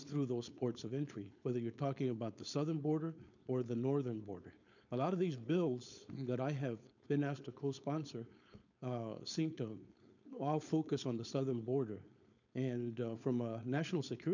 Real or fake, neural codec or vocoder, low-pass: fake; codec, 16 kHz, 4.8 kbps, FACodec; 7.2 kHz